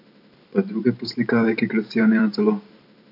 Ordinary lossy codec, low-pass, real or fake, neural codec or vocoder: none; 5.4 kHz; fake; vocoder, 44.1 kHz, 128 mel bands every 512 samples, BigVGAN v2